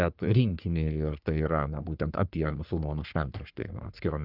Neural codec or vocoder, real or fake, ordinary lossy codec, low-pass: codec, 44.1 kHz, 3.4 kbps, Pupu-Codec; fake; Opus, 24 kbps; 5.4 kHz